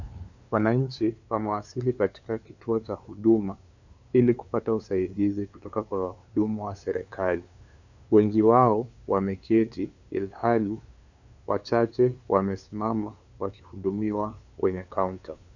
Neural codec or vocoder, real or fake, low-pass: codec, 16 kHz, 2 kbps, FunCodec, trained on LibriTTS, 25 frames a second; fake; 7.2 kHz